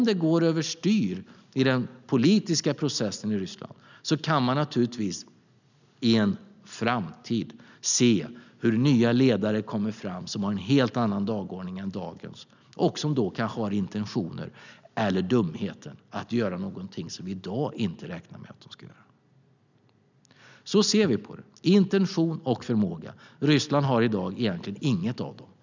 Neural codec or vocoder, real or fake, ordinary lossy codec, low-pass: none; real; none; 7.2 kHz